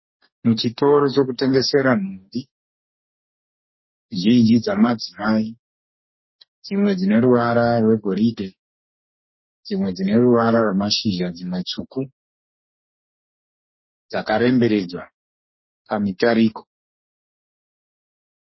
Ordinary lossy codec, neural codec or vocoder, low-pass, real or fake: MP3, 24 kbps; codec, 44.1 kHz, 2.6 kbps, DAC; 7.2 kHz; fake